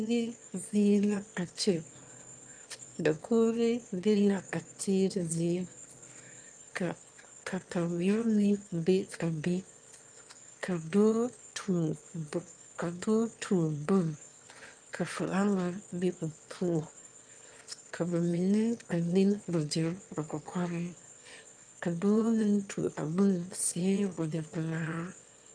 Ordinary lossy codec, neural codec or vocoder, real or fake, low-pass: Opus, 32 kbps; autoencoder, 22.05 kHz, a latent of 192 numbers a frame, VITS, trained on one speaker; fake; 9.9 kHz